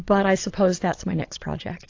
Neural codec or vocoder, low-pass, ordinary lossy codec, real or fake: vocoder, 22.05 kHz, 80 mel bands, Vocos; 7.2 kHz; AAC, 48 kbps; fake